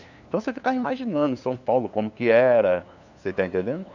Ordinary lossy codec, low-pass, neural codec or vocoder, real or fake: none; 7.2 kHz; codec, 16 kHz, 2 kbps, FunCodec, trained on LibriTTS, 25 frames a second; fake